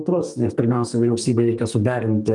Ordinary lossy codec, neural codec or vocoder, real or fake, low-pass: Opus, 32 kbps; codec, 32 kHz, 1.9 kbps, SNAC; fake; 10.8 kHz